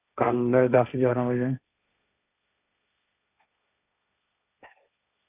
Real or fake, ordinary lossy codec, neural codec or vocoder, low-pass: fake; none; codec, 16 kHz, 1.1 kbps, Voila-Tokenizer; 3.6 kHz